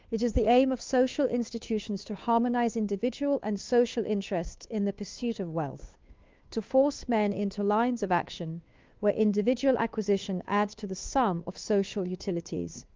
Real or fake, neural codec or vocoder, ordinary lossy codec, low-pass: fake; codec, 16 kHz, 2 kbps, FunCodec, trained on Chinese and English, 25 frames a second; Opus, 32 kbps; 7.2 kHz